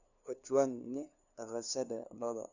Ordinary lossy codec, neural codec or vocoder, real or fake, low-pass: none; codec, 16 kHz, 2 kbps, FunCodec, trained on LibriTTS, 25 frames a second; fake; 7.2 kHz